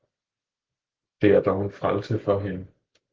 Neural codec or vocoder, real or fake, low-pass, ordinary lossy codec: none; real; 7.2 kHz; Opus, 16 kbps